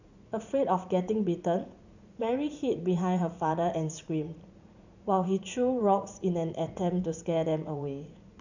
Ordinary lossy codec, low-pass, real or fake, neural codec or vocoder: none; 7.2 kHz; fake; vocoder, 44.1 kHz, 80 mel bands, Vocos